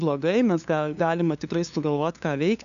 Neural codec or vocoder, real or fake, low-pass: codec, 16 kHz, 2 kbps, FunCodec, trained on LibriTTS, 25 frames a second; fake; 7.2 kHz